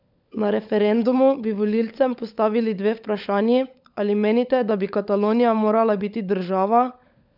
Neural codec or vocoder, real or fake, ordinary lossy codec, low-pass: codec, 16 kHz, 16 kbps, FunCodec, trained on LibriTTS, 50 frames a second; fake; none; 5.4 kHz